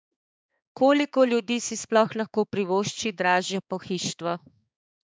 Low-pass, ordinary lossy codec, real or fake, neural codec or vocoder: none; none; fake; codec, 16 kHz, 4 kbps, X-Codec, HuBERT features, trained on balanced general audio